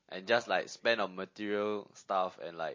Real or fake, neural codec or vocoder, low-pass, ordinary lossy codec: real; none; 7.2 kHz; MP3, 32 kbps